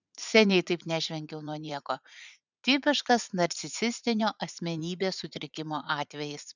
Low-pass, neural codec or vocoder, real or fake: 7.2 kHz; vocoder, 44.1 kHz, 80 mel bands, Vocos; fake